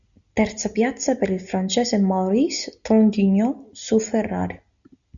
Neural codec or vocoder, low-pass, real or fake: none; 7.2 kHz; real